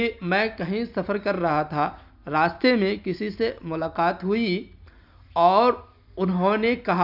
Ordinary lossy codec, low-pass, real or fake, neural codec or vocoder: none; 5.4 kHz; real; none